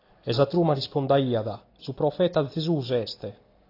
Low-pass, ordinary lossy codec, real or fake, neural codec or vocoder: 5.4 kHz; AAC, 32 kbps; real; none